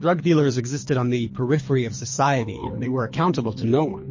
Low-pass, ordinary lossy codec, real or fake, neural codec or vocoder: 7.2 kHz; MP3, 32 kbps; fake; codec, 24 kHz, 3 kbps, HILCodec